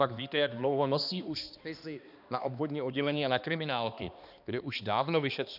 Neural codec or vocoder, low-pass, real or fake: codec, 16 kHz, 2 kbps, X-Codec, HuBERT features, trained on balanced general audio; 5.4 kHz; fake